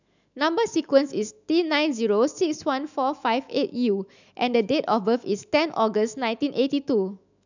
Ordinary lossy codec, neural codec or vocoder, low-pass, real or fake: none; autoencoder, 48 kHz, 128 numbers a frame, DAC-VAE, trained on Japanese speech; 7.2 kHz; fake